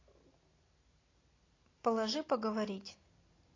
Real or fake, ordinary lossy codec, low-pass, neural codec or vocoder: real; AAC, 32 kbps; 7.2 kHz; none